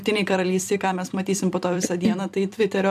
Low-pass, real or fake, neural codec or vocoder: 14.4 kHz; fake; vocoder, 44.1 kHz, 128 mel bands every 256 samples, BigVGAN v2